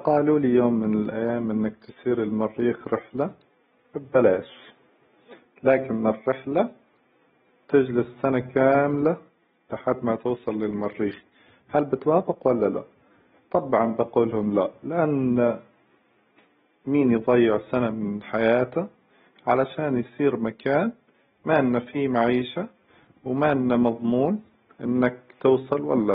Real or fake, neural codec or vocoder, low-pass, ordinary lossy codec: real; none; 7.2 kHz; AAC, 16 kbps